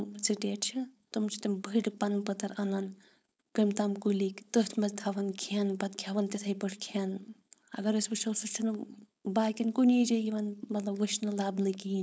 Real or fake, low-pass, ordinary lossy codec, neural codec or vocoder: fake; none; none; codec, 16 kHz, 4.8 kbps, FACodec